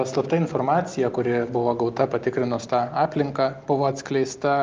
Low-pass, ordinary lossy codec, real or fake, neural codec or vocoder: 7.2 kHz; Opus, 32 kbps; real; none